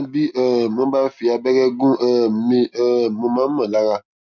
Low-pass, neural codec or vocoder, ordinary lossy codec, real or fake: 7.2 kHz; none; none; real